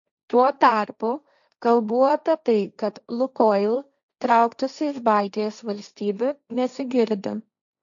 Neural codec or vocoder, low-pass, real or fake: codec, 16 kHz, 1.1 kbps, Voila-Tokenizer; 7.2 kHz; fake